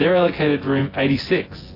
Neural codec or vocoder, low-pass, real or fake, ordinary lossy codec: vocoder, 24 kHz, 100 mel bands, Vocos; 5.4 kHz; fake; AAC, 24 kbps